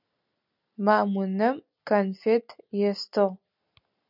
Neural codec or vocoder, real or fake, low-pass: none; real; 5.4 kHz